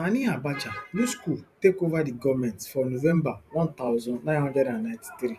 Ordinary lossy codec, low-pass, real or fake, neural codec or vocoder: none; 14.4 kHz; fake; vocoder, 44.1 kHz, 128 mel bands every 512 samples, BigVGAN v2